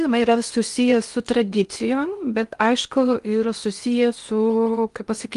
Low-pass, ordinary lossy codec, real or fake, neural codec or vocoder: 10.8 kHz; Opus, 32 kbps; fake; codec, 16 kHz in and 24 kHz out, 0.8 kbps, FocalCodec, streaming, 65536 codes